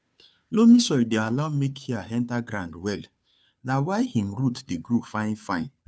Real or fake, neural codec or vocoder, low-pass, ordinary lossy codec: fake; codec, 16 kHz, 2 kbps, FunCodec, trained on Chinese and English, 25 frames a second; none; none